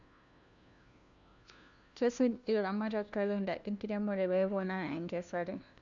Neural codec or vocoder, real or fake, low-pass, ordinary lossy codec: codec, 16 kHz, 1 kbps, FunCodec, trained on LibriTTS, 50 frames a second; fake; 7.2 kHz; none